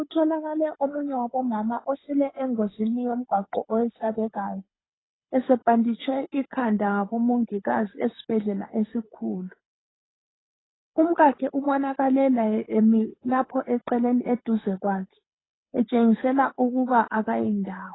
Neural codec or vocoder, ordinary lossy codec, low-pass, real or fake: codec, 24 kHz, 6 kbps, HILCodec; AAC, 16 kbps; 7.2 kHz; fake